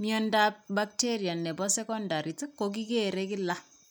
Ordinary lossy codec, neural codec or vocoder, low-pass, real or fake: none; none; none; real